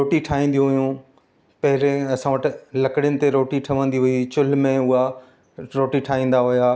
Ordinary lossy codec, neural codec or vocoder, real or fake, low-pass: none; none; real; none